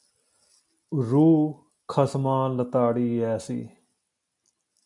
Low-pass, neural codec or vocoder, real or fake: 10.8 kHz; none; real